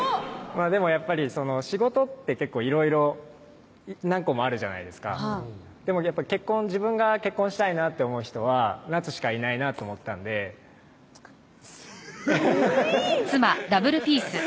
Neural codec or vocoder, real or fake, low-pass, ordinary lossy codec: none; real; none; none